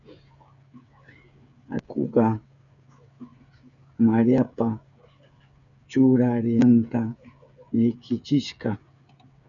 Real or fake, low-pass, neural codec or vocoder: fake; 7.2 kHz; codec, 16 kHz, 8 kbps, FreqCodec, smaller model